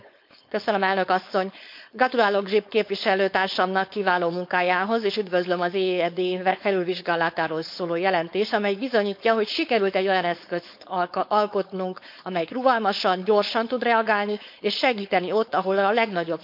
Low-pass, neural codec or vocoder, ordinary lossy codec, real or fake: 5.4 kHz; codec, 16 kHz, 4.8 kbps, FACodec; MP3, 48 kbps; fake